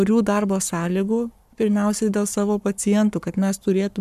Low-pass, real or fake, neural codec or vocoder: 14.4 kHz; fake; codec, 44.1 kHz, 7.8 kbps, Pupu-Codec